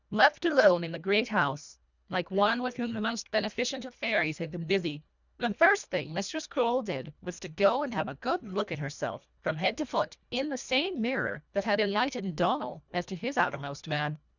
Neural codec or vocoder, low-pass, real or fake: codec, 24 kHz, 1.5 kbps, HILCodec; 7.2 kHz; fake